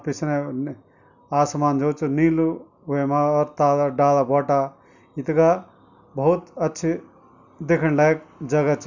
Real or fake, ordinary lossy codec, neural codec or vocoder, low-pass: real; none; none; 7.2 kHz